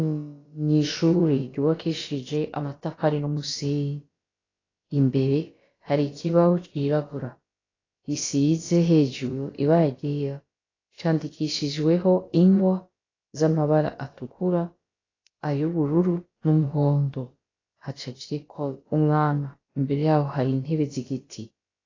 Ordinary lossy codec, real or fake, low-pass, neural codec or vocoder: AAC, 32 kbps; fake; 7.2 kHz; codec, 16 kHz, about 1 kbps, DyCAST, with the encoder's durations